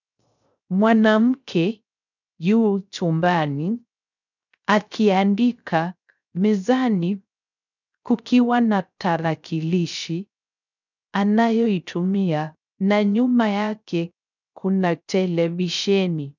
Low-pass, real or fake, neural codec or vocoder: 7.2 kHz; fake; codec, 16 kHz, 0.3 kbps, FocalCodec